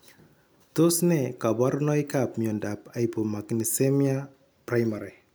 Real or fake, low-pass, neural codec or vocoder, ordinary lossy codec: real; none; none; none